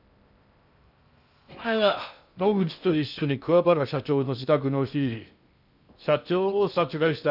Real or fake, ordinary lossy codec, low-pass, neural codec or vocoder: fake; none; 5.4 kHz; codec, 16 kHz in and 24 kHz out, 0.6 kbps, FocalCodec, streaming, 2048 codes